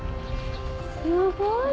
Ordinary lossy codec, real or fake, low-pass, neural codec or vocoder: none; real; none; none